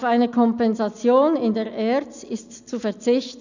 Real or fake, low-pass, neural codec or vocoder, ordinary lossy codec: real; 7.2 kHz; none; none